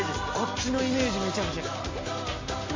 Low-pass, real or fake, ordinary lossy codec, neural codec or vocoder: 7.2 kHz; real; MP3, 32 kbps; none